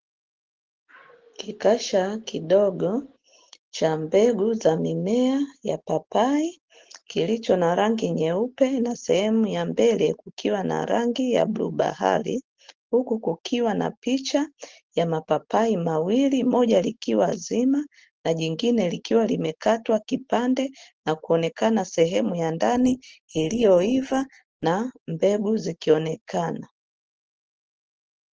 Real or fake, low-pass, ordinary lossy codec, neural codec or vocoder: real; 7.2 kHz; Opus, 16 kbps; none